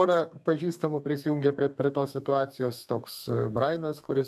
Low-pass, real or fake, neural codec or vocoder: 14.4 kHz; fake; codec, 32 kHz, 1.9 kbps, SNAC